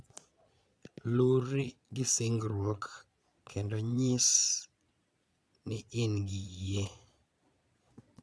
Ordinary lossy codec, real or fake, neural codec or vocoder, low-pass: none; fake; vocoder, 22.05 kHz, 80 mel bands, Vocos; none